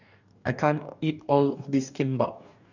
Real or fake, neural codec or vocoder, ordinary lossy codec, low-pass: fake; codec, 44.1 kHz, 2.6 kbps, DAC; none; 7.2 kHz